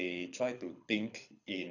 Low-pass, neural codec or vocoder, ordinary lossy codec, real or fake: 7.2 kHz; codec, 24 kHz, 6 kbps, HILCodec; none; fake